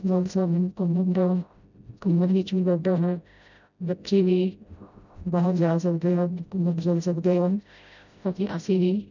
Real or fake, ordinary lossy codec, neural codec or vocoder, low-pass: fake; none; codec, 16 kHz, 0.5 kbps, FreqCodec, smaller model; 7.2 kHz